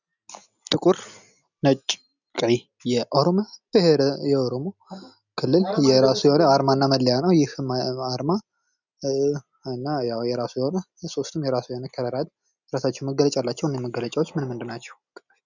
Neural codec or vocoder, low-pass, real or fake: none; 7.2 kHz; real